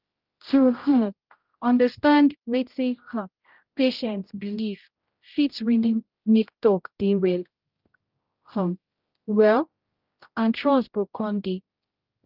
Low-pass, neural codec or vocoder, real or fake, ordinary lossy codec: 5.4 kHz; codec, 16 kHz, 0.5 kbps, X-Codec, HuBERT features, trained on general audio; fake; Opus, 32 kbps